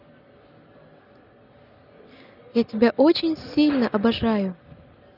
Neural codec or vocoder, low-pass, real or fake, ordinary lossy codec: none; 5.4 kHz; real; none